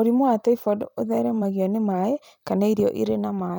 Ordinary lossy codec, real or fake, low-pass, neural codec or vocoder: none; fake; none; vocoder, 44.1 kHz, 128 mel bands every 256 samples, BigVGAN v2